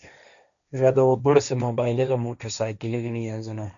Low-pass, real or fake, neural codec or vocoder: 7.2 kHz; fake; codec, 16 kHz, 1.1 kbps, Voila-Tokenizer